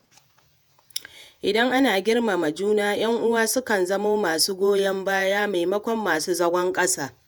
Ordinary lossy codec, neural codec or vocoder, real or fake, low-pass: none; vocoder, 48 kHz, 128 mel bands, Vocos; fake; none